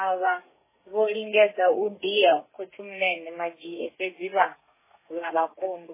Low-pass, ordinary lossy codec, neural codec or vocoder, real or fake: 3.6 kHz; MP3, 16 kbps; codec, 32 kHz, 1.9 kbps, SNAC; fake